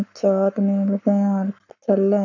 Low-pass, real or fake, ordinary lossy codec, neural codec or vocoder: 7.2 kHz; fake; none; codec, 44.1 kHz, 7.8 kbps, Pupu-Codec